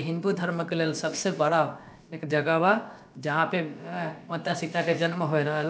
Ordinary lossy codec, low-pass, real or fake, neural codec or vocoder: none; none; fake; codec, 16 kHz, about 1 kbps, DyCAST, with the encoder's durations